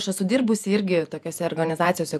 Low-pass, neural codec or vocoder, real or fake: 14.4 kHz; vocoder, 48 kHz, 128 mel bands, Vocos; fake